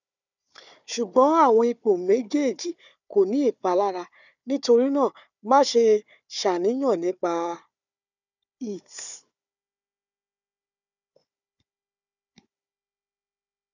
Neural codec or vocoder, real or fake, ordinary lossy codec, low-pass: codec, 16 kHz, 4 kbps, FunCodec, trained on Chinese and English, 50 frames a second; fake; none; 7.2 kHz